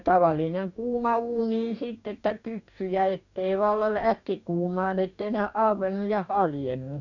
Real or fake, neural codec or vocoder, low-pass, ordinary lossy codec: fake; codec, 44.1 kHz, 2.6 kbps, DAC; 7.2 kHz; none